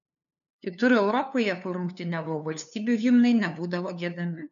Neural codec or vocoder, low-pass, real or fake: codec, 16 kHz, 2 kbps, FunCodec, trained on LibriTTS, 25 frames a second; 7.2 kHz; fake